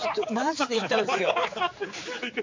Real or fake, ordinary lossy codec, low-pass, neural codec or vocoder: fake; MP3, 48 kbps; 7.2 kHz; codec, 16 kHz, 4 kbps, X-Codec, HuBERT features, trained on general audio